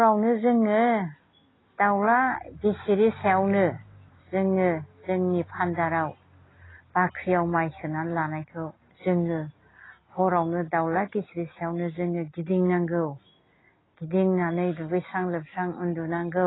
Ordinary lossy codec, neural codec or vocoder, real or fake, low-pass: AAC, 16 kbps; none; real; 7.2 kHz